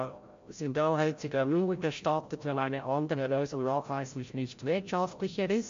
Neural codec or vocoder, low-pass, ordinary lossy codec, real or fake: codec, 16 kHz, 0.5 kbps, FreqCodec, larger model; 7.2 kHz; MP3, 48 kbps; fake